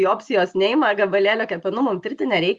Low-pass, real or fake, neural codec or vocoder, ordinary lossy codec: 10.8 kHz; real; none; Opus, 64 kbps